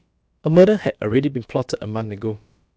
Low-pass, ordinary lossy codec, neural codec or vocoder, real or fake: none; none; codec, 16 kHz, about 1 kbps, DyCAST, with the encoder's durations; fake